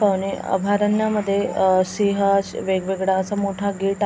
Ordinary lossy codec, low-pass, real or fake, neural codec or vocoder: none; none; real; none